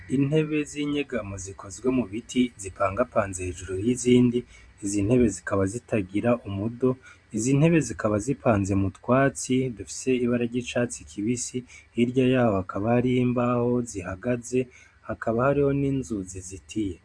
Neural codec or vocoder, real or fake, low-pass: vocoder, 44.1 kHz, 128 mel bands every 512 samples, BigVGAN v2; fake; 9.9 kHz